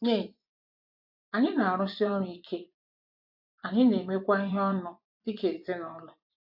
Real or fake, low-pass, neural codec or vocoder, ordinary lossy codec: fake; 5.4 kHz; vocoder, 24 kHz, 100 mel bands, Vocos; AAC, 48 kbps